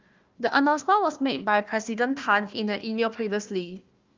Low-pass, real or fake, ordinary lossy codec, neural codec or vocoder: 7.2 kHz; fake; Opus, 24 kbps; codec, 16 kHz, 1 kbps, FunCodec, trained on Chinese and English, 50 frames a second